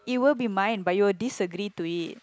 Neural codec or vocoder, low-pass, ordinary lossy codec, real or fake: none; none; none; real